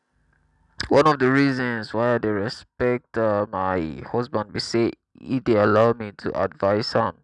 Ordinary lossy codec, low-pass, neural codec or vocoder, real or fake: none; 10.8 kHz; none; real